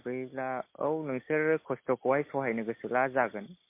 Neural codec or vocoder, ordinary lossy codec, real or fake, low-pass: none; MP3, 24 kbps; real; 3.6 kHz